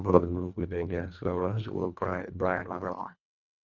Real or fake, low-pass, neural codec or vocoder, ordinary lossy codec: fake; 7.2 kHz; codec, 24 kHz, 1.5 kbps, HILCodec; none